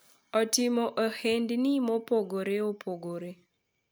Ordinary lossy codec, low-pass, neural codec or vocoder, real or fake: none; none; none; real